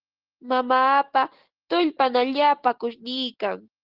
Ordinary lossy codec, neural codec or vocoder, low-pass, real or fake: Opus, 16 kbps; none; 5.4 kHz; real